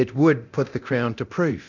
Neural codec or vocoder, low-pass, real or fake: codec, 24 kHz, 0.9 kbps, DualCodec; 7.2 kHz; fake